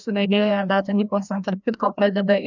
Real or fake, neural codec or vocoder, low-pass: fake; codec, 16 kHz, 1 kbps, FreqCodec, larger model; 7.2 kHz